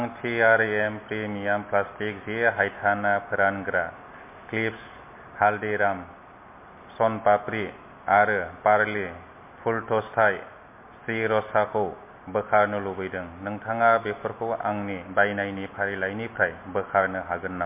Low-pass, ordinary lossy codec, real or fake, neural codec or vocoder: 3.6 kHz; MP3, 24 kbps; real; none